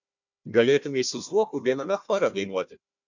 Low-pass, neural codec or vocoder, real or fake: 7.2 kHz; codec, 16 kHz, 1 kbps, FunCodec, trained on Chinese and English, 50 frames a second; fake